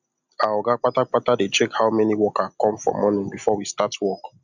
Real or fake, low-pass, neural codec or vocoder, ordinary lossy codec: real; 7.2 kHz; none; none